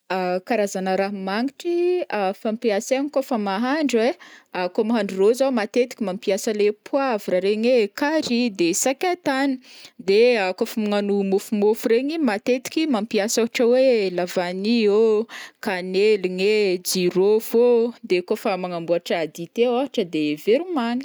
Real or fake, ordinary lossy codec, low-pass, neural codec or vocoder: real; none; none; none